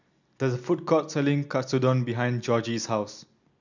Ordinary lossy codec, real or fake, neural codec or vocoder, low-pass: none; real; none; 7.2 kHz